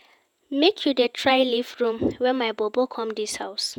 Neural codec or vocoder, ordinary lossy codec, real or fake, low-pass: vocoder, 48 kHz, 128 mel bands, Vocos; none; fake; 19.8 kHz